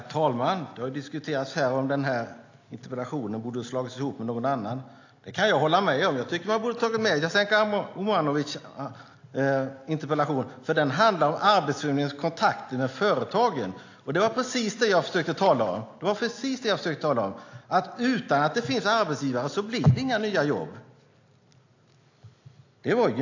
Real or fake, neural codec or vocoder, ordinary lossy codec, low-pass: real; none; AAC, 48 kbps; 7.2 kHz